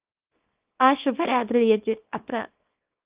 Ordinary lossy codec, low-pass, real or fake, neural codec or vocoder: Opus, 32 kbps; 3.6 kHz; fake; codec, 24 kHz, 0.9 kbps, WavTokenizer, small release